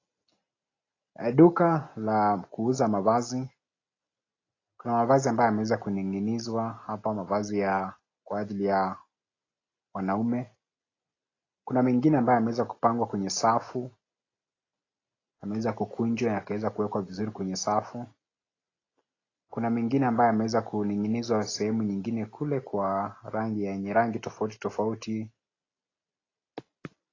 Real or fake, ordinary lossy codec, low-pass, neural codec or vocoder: real; AAC, 32 kbps; 7.2 kHz; none